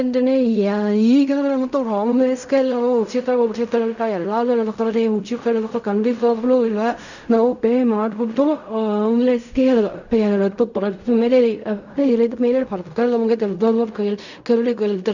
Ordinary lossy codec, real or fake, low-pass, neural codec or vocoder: none; fake; 7.2 kHz; codec, 16 kHz in and 24 kHz out, 0.4 kbps, LongCat-Audio-Codec, fine tuned four codebook decoder